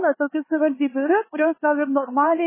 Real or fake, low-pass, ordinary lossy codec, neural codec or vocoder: fake; 3.6 kHz; MP3, 16 kbps; codec, 16 kHz, 4 kbps, X-Codec, HuBERT features, trained on LibriSpeech